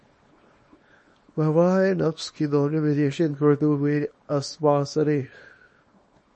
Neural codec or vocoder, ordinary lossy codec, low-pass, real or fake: codec, 24 kHz, 0.9 kbps, WavTokenizer, small release; MP3, 32 kbps; 10.8 kHz; fake